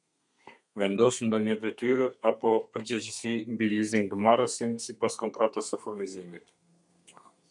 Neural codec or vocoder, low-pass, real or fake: codec, 32 kHz, 1.9 kbps, SNAC; 10.8 kHz; fake